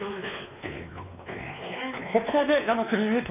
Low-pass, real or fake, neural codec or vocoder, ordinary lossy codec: 3.6 kHz; fake; codec, 16 kHz, 2 kbps, X-Codec, WavLM features, trained on Multilingual LibriSpeech; none